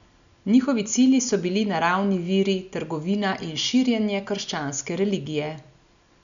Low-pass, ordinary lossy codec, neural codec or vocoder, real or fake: 7.2 kHz; none; none; real